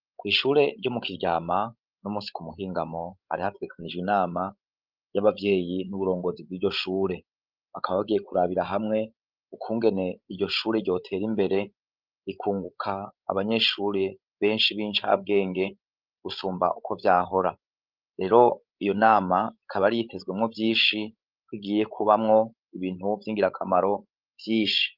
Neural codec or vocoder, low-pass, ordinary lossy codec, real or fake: codec, 16 kHz, 16 kbps, FreqCodec, larger model; 5.4 kHz; Opus, 24 kbps; fake